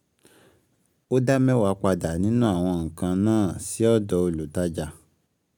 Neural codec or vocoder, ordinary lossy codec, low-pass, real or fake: none; none; 19.8 kHz; real